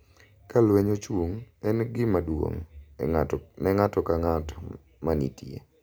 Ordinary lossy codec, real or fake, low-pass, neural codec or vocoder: none; real; none; none